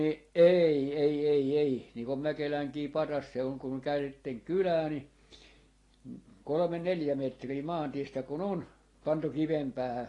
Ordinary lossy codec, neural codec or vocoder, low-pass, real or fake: AAC, 32 kbps; none; 10.8 kHz; real